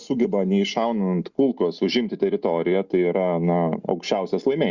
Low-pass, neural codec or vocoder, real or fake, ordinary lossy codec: 7.2 kHz; none; real; Opus, 64 kbps